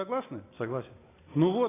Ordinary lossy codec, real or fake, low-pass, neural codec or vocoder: AAC, 16 kbps; real; 3.6 kHz; none